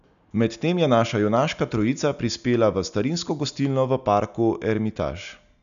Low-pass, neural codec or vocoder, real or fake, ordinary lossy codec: 7.2 kHz; none; real; none